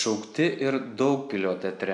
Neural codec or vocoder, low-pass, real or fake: none; 10.8 kHz; real